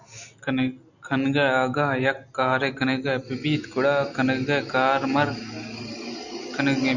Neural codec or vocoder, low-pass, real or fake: none; 7.2 kHz; real